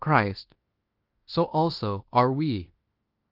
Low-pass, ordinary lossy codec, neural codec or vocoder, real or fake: 5.4 kHz; Opus, 24 kbps; codec, 16 kHz in and 24 kHz out, 0.9 kbps, LongCat-Audio-Codec, fine tuned four codebook decoder; fake